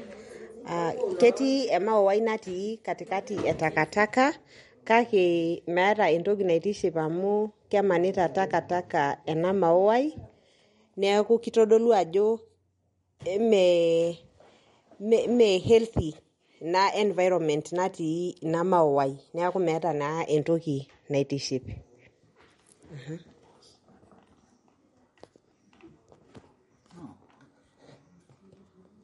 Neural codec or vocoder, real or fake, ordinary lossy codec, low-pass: none; real; MP3, 48 kbps; 19.8 kHz